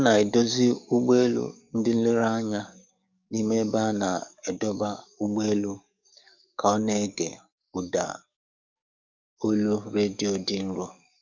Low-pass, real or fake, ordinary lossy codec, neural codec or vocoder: 7.2 kHz; fake; none; codec, 44.1 kHz, 7.8 kbps, DAC